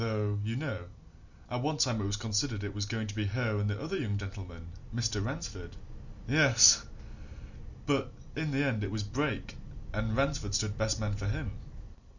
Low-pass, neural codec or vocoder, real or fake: 7.2 kHz; none; real